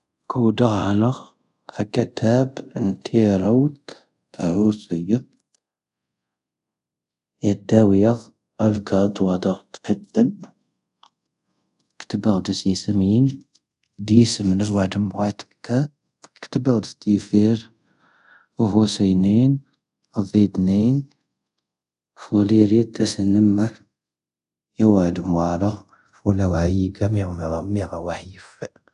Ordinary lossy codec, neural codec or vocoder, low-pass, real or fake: none; codec, 24 kHz, 0.5 kbps, DualCodec; 10.8 kHz; fake